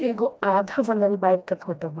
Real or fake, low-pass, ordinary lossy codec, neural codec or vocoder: fake; none; none; codec, 16 kHz, 1 kbps, FreqCodec, smaller model